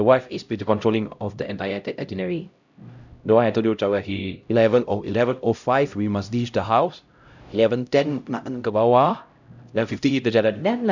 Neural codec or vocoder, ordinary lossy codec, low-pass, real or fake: codec, 16 kHz, 0.5 kbps, X-Codec, HuBERT features, trained on LibriSpeech; none; 7.2 kHz; fake